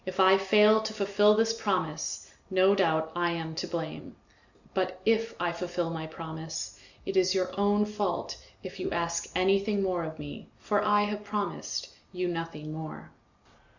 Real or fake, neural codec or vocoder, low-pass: real; none; 7.2 kHz